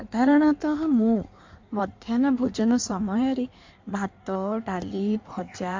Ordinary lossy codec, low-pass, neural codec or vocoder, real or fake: MP3, 48 kbps; 7.2 kHz; codec, 16 kHz in and 24 kHz out, 1.1 kbps, FireRedTTS-2 codec; fake